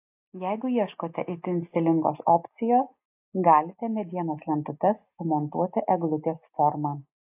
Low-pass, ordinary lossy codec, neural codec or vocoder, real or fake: 3.6 kHz; AAC, 32 kbps; none; real